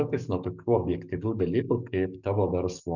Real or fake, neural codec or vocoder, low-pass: fake; codec, 44.1 kHz, 7.8 kbps, DAC; 7.2 kHz